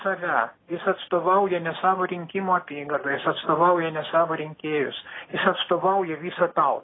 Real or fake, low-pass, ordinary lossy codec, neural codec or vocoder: real; 7.2 kHz; AAC, 16 kbps; none